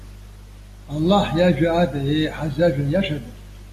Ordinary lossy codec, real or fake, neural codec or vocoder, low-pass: AAC, 48 kbps; real; none; 14.4 kHz